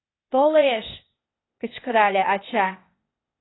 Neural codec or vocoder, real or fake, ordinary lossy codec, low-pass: codec, 16 kHz, 0.8 kbps, ZipCodec; fake; AAC, 16 kbps; 7.2 kHz